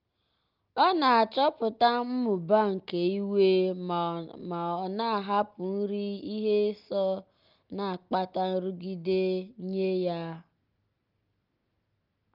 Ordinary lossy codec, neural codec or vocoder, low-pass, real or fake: Opus, 24 kbps; none; 5.4 kHz; real